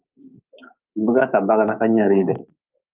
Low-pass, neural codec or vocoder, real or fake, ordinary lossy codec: 3.6 kHz; codec, 24 kHz, 3.1 kbps, DualCodec; fake; Opus, 24 kbps